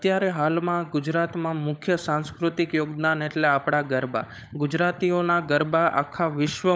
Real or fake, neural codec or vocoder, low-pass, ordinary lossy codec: fake; codec, 16 kHz, 16 kbps, FunCodec, trained on LibriTTS, 50 frames a second; none; none